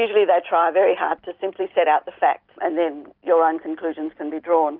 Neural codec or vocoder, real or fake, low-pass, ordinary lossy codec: none; real; 5.4 kHz; Opus, 32 kbps